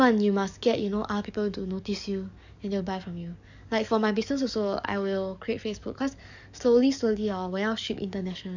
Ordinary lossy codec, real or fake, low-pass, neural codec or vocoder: none; fake; 7.2 kHz; codec, 44.1 kHz, 7.8 kbps, DAC